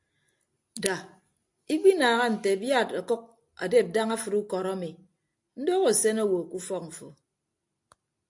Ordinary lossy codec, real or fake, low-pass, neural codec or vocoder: AAC, 64 kbps; real; 10.8 kHz; none